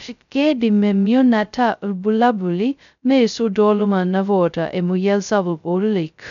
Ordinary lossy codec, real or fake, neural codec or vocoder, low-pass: none; fake; codec, 16 kHz, 0.2 kbps, FocalCodec; 7.2 kHz